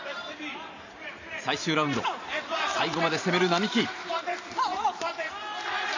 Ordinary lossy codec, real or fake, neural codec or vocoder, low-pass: AAC, 48 kbps; fake; vocoder, 44.1 kHz, 128 mel bands every 512 samples, BigVGAN v2; 7.2 kHz